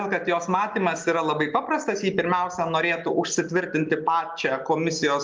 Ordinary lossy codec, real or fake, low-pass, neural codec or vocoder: Opus, 24 kbps; real; 7.2 kHz; none